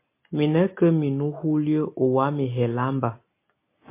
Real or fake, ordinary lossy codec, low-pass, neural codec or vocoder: real; MP3, 24 kbps; 3.6 kHz; none